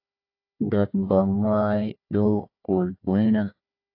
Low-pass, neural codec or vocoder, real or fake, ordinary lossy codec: 5.4 kHz; codec, 16 kHz, 1 kbps, FunCodec, trained on Chinese and English, 50 frames a second; fake; AAC, 32 kbps